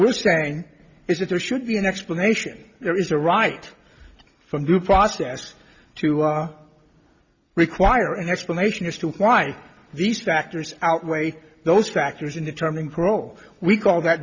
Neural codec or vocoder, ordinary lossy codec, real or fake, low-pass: none; Opus, 64 kbps; real; 7.2 kHz